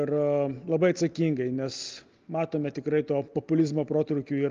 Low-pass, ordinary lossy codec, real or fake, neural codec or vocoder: 7.2 kHz; Opus, 32 kbps; real; none